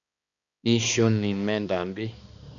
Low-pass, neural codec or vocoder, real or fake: 7.2 kHz; codec, 16 kHz, 2 kbps, X-Codec, HuBERT features, trained on balanced general audio; fake